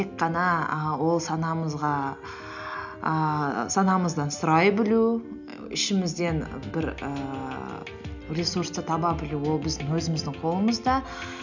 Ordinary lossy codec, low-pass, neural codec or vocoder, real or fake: none; 7.2 kHz; none; real